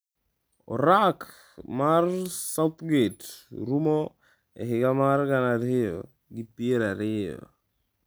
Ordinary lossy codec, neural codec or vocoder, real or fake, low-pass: none; none; real; none